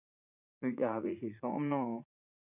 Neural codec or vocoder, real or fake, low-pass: vocoder, 44.1 kHz, 80 mel bands, Vocos; fake; 3.6 kHz